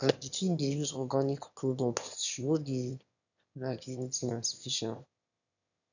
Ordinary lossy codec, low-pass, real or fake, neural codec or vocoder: none; 7.2 kHz; fake; autoencoder, 22.05 kHz, a latent of 192 numbers a frame, VITS, trained on one speaker